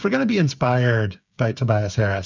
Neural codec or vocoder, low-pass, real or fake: codec, 16 kHz, 8 kbps, FreqCodec, smaller model; 7.2 kHz; fake